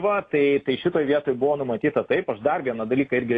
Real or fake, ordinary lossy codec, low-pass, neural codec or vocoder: real; AAC, 32 kbps; 9.9 kHz; none